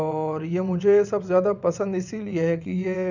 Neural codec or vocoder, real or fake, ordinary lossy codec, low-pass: vocoder, 22.05 kHz, 80 mel bands, WaveNeXt; fake; none; 7.2 kHz